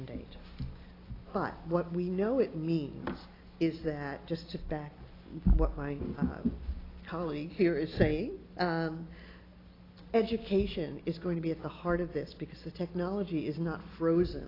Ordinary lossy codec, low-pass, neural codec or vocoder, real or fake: AAC, 24 kbps; 5.4 kHz; none; real